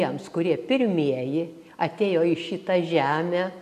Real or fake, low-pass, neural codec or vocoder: real; 14.4 kHz; none